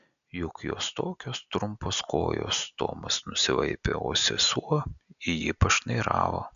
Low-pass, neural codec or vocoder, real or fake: 7.2 kHz; none; real